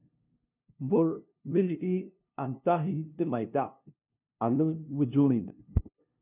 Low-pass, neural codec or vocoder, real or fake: 3.6 kHz; codec, 16 kHz, 0.5 kbps, FunCodec, trained on LibriTTS, 25 frames a second; fake